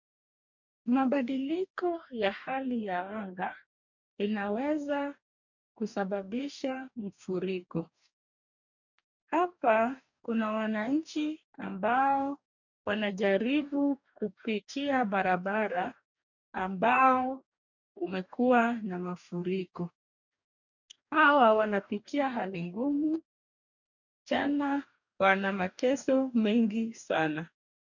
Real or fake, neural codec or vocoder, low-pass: fake; codec, 44.1 kHz, 2.6 kbps, DAC; 7.2 kHz